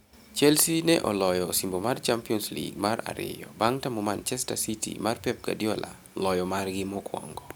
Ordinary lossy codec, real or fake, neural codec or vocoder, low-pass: none; real; none; none